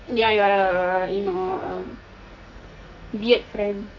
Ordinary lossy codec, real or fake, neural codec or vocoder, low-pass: none; fake; codec, 44.1 kHz, 2.6 kbps, SNAC; 7.2 kHz